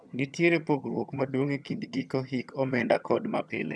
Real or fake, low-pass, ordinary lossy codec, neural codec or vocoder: fake; none; none; vocoder, 22.05 kHz, 80 mel bands, HiFi-GAN